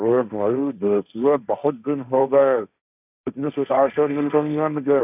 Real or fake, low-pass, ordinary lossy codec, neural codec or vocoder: fake; 3.6 kHz; none; codec, 16 kHz, 1.1 kbps, Voila-Tokenizer